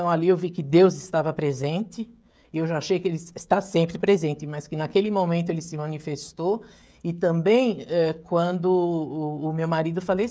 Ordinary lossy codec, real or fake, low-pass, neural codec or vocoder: none; fake; none; codec, 16 kHz, 16 kbps, FreqCodec, smaller model